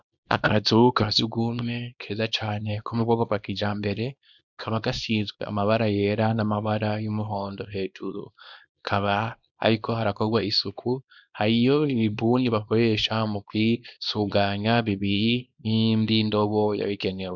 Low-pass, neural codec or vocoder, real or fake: 7.2 kHz; codec, 24 kHz, 0.9 kbps, WavTokenizer, small release; fake